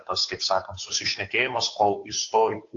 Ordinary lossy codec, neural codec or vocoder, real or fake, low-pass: AAC, 48 kbps; codec, 16 kHz, 2 kbps, FunCodec, trained on Chinese and English, 25 frames a second; fake; 7.2 kHz